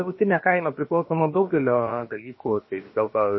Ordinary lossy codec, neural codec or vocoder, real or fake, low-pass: MP3, 24 kbps; codec, 16 kHz, about 1 kbps, DyCAST, with the encoder's durations; fake; 7.2 kHz